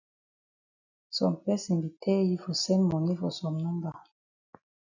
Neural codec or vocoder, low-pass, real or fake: none; 7.2 kHz; real